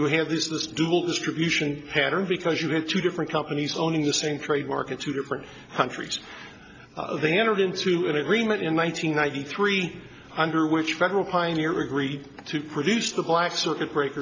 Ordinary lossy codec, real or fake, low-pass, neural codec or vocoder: AAC, 48 kbps; real; 7.2 kHz; none